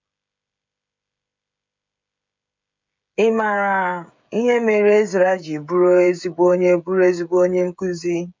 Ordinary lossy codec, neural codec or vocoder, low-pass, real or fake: MP3, 48 kbps; codec, 16 kHz, 16 kbps, FreqCodec, smaller model; 7.2 kHz; fake